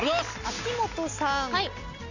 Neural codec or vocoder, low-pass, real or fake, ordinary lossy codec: none; 7.2 kHz; real; none